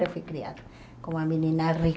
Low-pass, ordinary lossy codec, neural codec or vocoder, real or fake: none; none; none; real